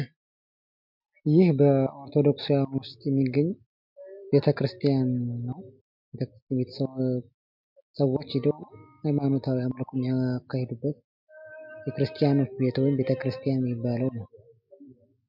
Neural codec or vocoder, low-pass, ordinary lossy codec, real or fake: none; 5.4 kHz; MP3, 48 kbps; real